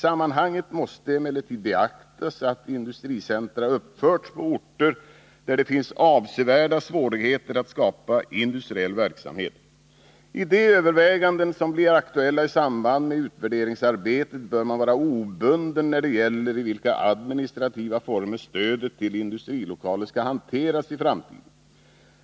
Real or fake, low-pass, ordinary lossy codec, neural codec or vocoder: real; none; none; none